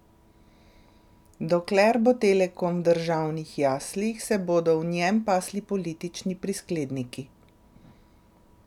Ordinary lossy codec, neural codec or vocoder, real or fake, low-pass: none; none; real; 19.8 kHz